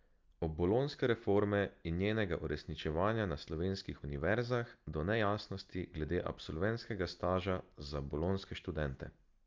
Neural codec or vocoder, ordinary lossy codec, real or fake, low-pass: none; Opus, 24 kbps; real; 7.2 kHz